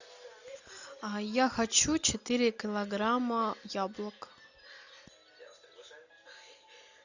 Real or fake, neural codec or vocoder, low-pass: real; none; 7.2 kHz